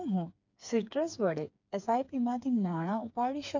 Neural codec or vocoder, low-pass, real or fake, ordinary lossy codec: codec, 16 kHz, 4 kbps, FreqCodec, smaller model; 7.2 kHz; fake; MP3, 64 kbps